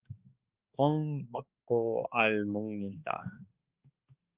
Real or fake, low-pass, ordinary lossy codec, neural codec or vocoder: fake; 3.6 kHz; Opus, 24 kbps; codec, 16 kHz, 2 kbps, X-Codec, HuBERT features, trained on balanced general audio